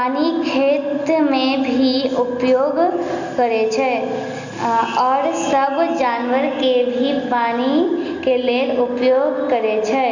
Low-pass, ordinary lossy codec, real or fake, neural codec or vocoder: 7.2 kHz; Opus, 64 kbps; real; none